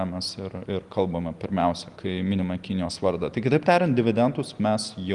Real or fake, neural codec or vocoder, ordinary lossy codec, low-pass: real; none; Opus, 24 kbps; 10.8 kHz